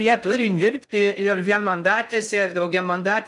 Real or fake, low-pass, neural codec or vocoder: fake; 10.8 kHz; codec, 16 kHz in and 24 kHz out, 0.6 kbps, FocalCodec, streaming, 2048 codes